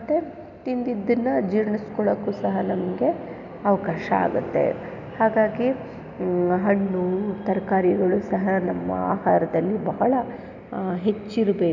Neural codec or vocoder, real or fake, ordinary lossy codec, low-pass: none; real; none; 7.2 kHz